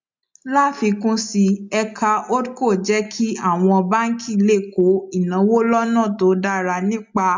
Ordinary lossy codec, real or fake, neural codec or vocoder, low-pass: MP3, 64 kbps; real; none; 7.2 kHz